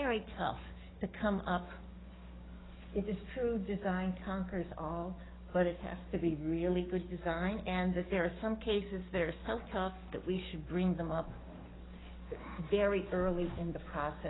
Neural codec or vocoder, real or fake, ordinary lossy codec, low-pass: codec, 44.1 kHz, 7.8 kbps, DAC; fake; AAC, 16 kbps; 7.2 kHz